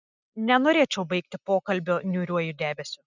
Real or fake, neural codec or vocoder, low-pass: real; none; 7.2 kHz